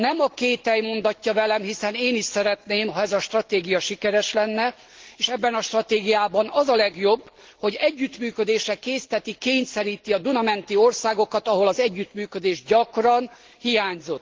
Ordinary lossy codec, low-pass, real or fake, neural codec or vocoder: Opus, 16 kbps; 7.2 kHz; real; none